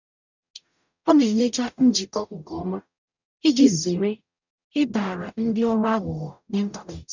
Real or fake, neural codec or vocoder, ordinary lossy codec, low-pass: fake; codec, 44.1 kHz, 0.9 kbps, DAC; none; 7.2 kHz